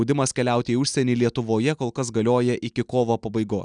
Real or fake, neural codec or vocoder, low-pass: real; none; 9.9 kHz